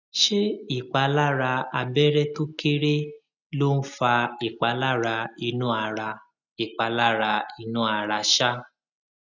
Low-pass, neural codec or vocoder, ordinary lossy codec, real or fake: 7.2 kHz; none; none; real